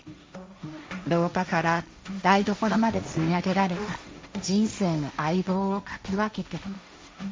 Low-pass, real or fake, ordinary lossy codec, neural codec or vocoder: none; fake; none; codec, 16 kHz, 1.1 kbps, Voila-Tokenizer